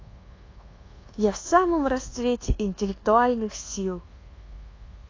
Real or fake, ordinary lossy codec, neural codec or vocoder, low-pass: fake; AAC, 32 kbps; codec, 24 kHz, 1.2 kbps, DualCodec; 7.2 kHz